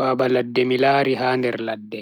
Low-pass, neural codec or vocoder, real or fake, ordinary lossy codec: 19.8 kHz; none; real; none